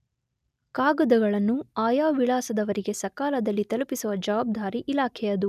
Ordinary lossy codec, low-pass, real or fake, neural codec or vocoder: none; 14.4 kHz; real; none